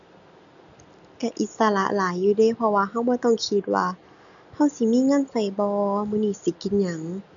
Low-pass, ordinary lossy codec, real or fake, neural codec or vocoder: 7.2 kHz; none; real; none